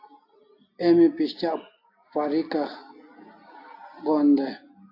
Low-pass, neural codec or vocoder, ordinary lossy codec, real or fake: 5.4 kHz; none; AAC, 32 kbps; real